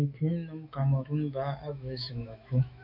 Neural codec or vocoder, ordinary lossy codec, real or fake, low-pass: autoencoder, 48 kHz, 128 numbers a frame, DAC-VAE, trained on Japanese speech; AAC, 48 kbps; fake; 5.4 kHz